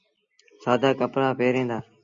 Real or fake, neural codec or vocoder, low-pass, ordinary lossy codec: real; none; 7.2 kHz; Opus, 64 kbps